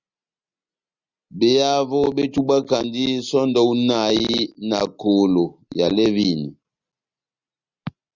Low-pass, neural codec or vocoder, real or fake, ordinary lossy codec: 7.2 kHz; none; real; Opus, 64 kbps